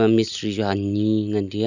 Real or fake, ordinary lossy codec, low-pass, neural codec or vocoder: real; none; 7.2 kHz; none